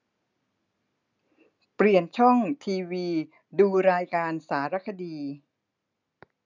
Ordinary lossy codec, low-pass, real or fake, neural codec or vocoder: none; 7.2 kHz; real; none